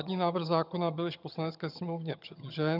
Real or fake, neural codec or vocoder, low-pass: fake; vocoder, 22.05 kHz, 80 mel bands, HiFi-GAN; 5.4 kHz